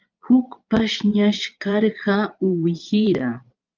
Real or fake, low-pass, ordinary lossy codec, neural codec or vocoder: fake; 7.2 kHz; Opus, 24 kbps; vocoder, 22.05 kHz, 80 mel bands, Vocos